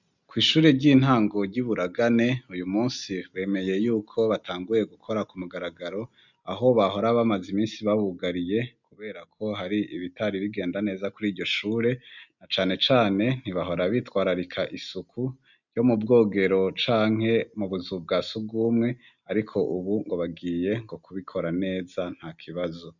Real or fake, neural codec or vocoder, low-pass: real; none; 7.2 kHz